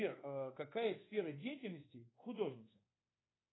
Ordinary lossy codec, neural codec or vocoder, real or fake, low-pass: AAC, 16 kbps; codec, 24 kHz, 1.2 kbps, DualCodec; fake; 7.2 kHz